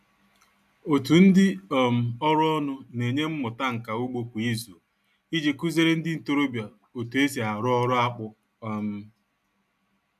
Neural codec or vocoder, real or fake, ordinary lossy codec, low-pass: none; real; AAC, 96 kbps; 14.4 kHz